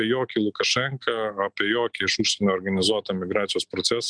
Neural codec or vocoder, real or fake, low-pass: none; real; 9.9 kHz